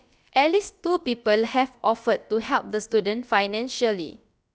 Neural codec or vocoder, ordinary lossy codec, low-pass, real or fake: codec, 16 kHz, about 1 kbps, DyCAST, with the encoder's durations; none; none; fake